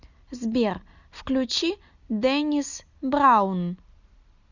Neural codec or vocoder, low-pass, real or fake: none; 7.2 kHz; real